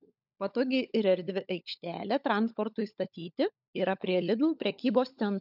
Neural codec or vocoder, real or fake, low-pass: codec, 16 kHz, 16 kbps, FunCodec, trained on LibriTTS, 50 frames a second; fake; 5.4 kHz